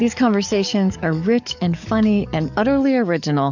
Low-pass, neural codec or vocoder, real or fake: 7.2 kHz; codec, 44.1 kHz, 7.8 kbps, DAC; fake